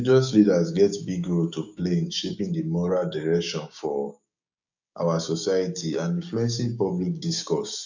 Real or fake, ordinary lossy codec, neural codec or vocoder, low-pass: fake; none; codec, 16 kHz, 6 kbps, DAC; 7.2 kHz